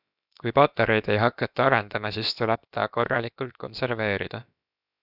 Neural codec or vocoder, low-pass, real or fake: codec, 16 kHz, about 1 kbps, DyCAST, with the encoder's durations; 5.4 kHz; fake